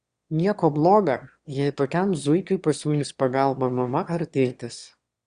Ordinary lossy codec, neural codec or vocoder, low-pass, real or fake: Opus, 64 kbps; autoencoder, 22.05 kHz, a latent of 192 numbers a frame, VITS, trained on one speaker; 9.9 kHz; fake